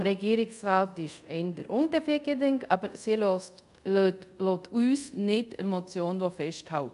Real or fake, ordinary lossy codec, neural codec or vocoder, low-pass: fake; none; codec, 24 kHz, 0.5 kbps, DualCodec; 10.8 kHz